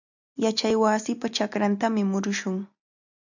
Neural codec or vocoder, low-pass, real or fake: none; 7.2 kHz; real